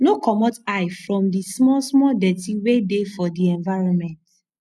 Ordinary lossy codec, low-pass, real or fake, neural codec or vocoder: none; none; real; none